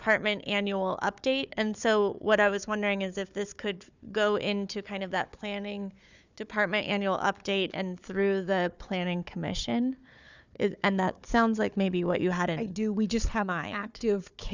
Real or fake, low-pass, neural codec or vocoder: fake; 7.2 kHz; codec, 16 kHz, 4 kbps, FunCodec, trained on Chinese and English, 50 frames a second